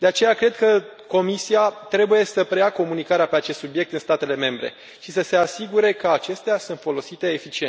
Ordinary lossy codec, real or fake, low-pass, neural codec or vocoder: none; real; none; none